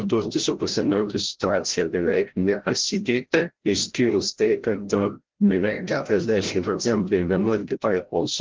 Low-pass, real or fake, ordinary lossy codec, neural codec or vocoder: 7.2 kHz; fake; Opus, 16 kbps; codec, 16 kHz, 0.5 kbps, FreqCodec, larger model